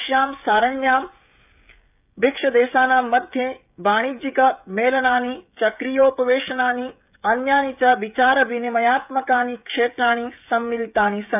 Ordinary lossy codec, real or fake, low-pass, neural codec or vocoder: none; fake; 3.6 kHz; codec, 16 kHz, 16 kbps, FreqCodec, smaller model